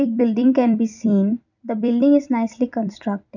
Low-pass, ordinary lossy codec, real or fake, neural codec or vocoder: 7.2 kHz; none; fake; vocoder, 44.1 kHz, 128 mel bands every 256 samples, BigVGAN v2